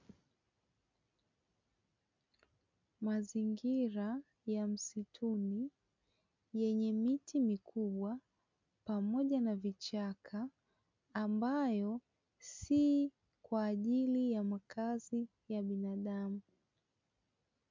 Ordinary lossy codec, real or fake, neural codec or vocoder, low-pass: MP3, 64 kbps; real; none; 7.2 kHz